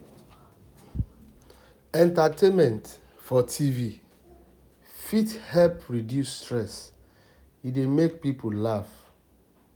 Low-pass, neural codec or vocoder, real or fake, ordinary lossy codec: none; none; real; none